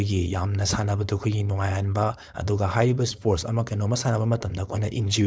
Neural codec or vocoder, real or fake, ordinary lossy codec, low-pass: codec, 16 kHz, 4.8 kbps, FACodec; fake; none; none